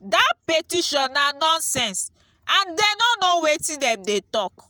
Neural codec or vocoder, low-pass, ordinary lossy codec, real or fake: vocoder, 48 kHz, 128 mel bands, Vocos; none; none; fake